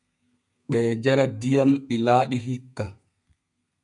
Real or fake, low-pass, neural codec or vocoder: fake; 10.8 kHz; codec, 32 kHz, 1.9 kbps, SNAC